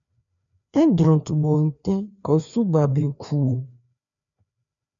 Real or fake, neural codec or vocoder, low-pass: fake; codec, 16 kHz, 2 kbps, FreqCodec, larger model; 7.2 kHz